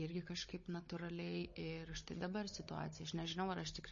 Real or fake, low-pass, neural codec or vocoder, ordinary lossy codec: fake; 7.2 kHz; codec, 16 kHz, 16 kbps, FunCodec, trained on Chinese and English, 50 frames a second; MP3, 32 kbps